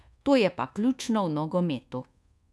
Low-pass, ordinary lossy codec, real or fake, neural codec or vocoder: none; none; fake; codec, 24 kHz, 1.2 kbps, DualCodec